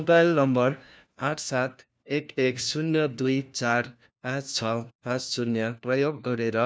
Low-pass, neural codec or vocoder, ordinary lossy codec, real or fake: none; codec, 16 kHz, 1 kbps, FunCodec, trained on LibriTTS, 50 frames a second; none; fake